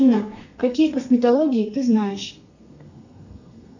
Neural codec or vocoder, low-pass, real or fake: codec, 32 kHz, 1.9 kbps, SNAC; 7.2 kHz; fake